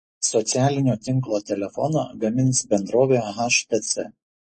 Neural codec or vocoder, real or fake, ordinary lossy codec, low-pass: none; real; MP3, 32 kbps; 9.9 kHz